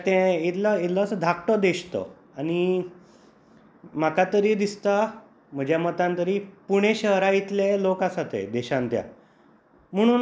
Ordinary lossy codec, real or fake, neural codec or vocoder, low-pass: none; real; none; none